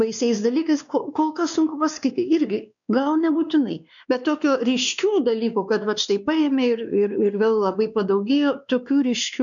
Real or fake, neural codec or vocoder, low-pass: fake; codec, 16 kHz, 2 kbps, X-Codec, WavLM features, trained on Multilingual LibriSpeech; 7.2 kHz